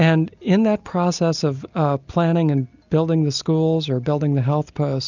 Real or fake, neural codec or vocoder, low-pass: real; none; 7.2 kHz